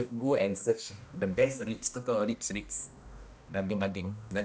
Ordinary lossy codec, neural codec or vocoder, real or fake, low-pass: none; codec, 16 kHz, 1 kbps, X-Codec, HuBERT features, trained on balanced general audio; fake; none